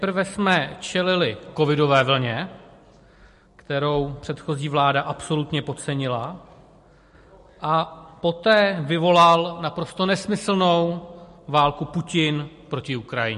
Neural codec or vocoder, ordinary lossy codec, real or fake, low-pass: none; MP3, 48 kbps; real; 10.8 kHz